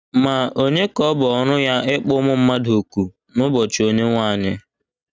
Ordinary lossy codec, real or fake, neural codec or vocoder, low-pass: none; real; none; none